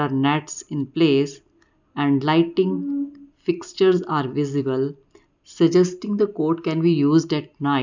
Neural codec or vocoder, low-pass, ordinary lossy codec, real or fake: none; 7.2 kHz; none; real